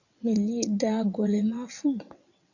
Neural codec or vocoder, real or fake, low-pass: vocoder, 22.05 kHz, 80 mel bands, WaveNeXt; fake; 7.2 kHz